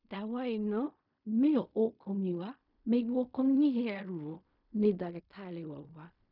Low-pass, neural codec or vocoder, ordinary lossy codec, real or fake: 5.4 kHz; codec, 16 kHz in and 24 kHz out, 0.4 kbps, LongCat-Audio-Codec, fine tuned four codebook decoder; none; fake